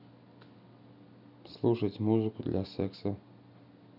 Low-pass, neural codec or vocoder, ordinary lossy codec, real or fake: 5.4 kHz; none; none; real